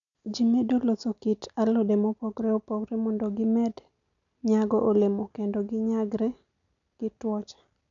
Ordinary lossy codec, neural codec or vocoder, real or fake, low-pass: none; none; real; 7.2 kHz